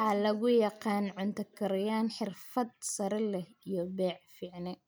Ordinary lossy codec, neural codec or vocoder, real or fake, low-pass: none; none; real; none